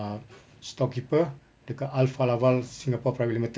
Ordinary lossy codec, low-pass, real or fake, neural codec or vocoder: none; none; real; none